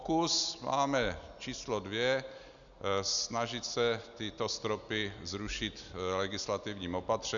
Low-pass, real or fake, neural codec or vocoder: 7.2 kHz; real; none